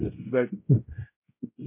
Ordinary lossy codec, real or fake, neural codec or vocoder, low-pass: MP3, 24 kbps; fake; codec, 16 kHz, 0.5 kbps, X-Codec, WavLM features, trained on Multilingual LibriSpeech; 3.6 kHz